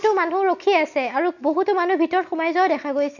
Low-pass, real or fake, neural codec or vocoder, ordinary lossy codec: 7.2 kHz; real; none; none